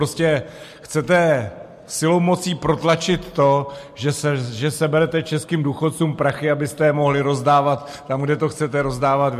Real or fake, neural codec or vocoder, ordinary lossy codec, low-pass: real; none; MP3, 64 kbps; 14.4 kHz